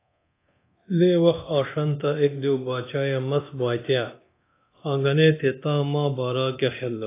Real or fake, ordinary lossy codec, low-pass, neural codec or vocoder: fake; AAC, 24 kbps; 3.6 kHz; codec, 24 kHz, 0.9 kbps, DualCodec